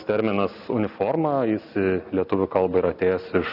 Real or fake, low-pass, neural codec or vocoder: real; 5.4 kHz; none